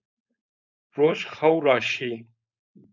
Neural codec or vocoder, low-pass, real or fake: codec, 16 kHz, 4.8 kbps, FACodec; 7.2 kHz; fake